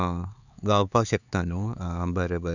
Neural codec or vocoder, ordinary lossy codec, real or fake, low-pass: codec, 16 kHz, 4 kbps, X-Codec, HuBERT features, trained on LibriSpeech; none; fake; 7.2 kHz